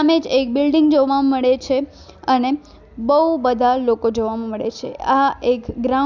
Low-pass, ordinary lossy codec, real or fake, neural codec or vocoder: 7.2 kHz; none; real; none